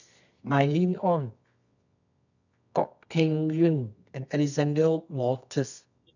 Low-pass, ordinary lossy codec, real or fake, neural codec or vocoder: 7.2 kHz; none; fake; codec, 24 kHz, 0.9 kbps, WavTokenizer, medium music audio release